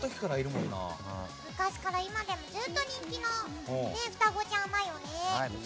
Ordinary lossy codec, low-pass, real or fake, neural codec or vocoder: none; none; real; none